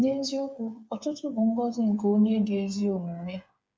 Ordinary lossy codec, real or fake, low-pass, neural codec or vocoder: none; fake; none; codec, 16 kHz, 8 kbps, FreqCodec, smaller model